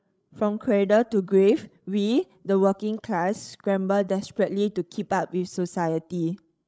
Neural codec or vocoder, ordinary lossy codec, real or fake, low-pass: codec, 16 kHz, 16 kbps, FreqCodec, larger model; none; fake; none